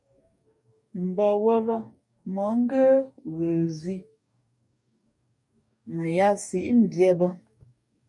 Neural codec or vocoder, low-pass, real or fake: codec, 44.1 kHz, 2.6 kbps, DAC; 10.8 kHz; fake